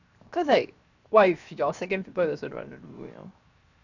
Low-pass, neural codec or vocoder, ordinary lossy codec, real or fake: 7.2 kHz; codec, 16 kHz, 0.7 kbps, FocalCodec; none; fake